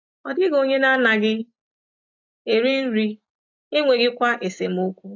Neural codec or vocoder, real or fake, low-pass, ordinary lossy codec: none; real; 7.2 kHz; AAC, 48 kbps